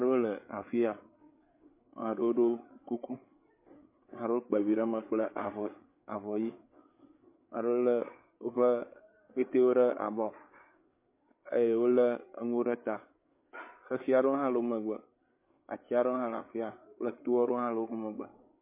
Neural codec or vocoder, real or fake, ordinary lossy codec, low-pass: codec, 16 kHz, 8 kbps, FreqCodec, larger model; fake; MP3, 24 kbps; 3.6 kHz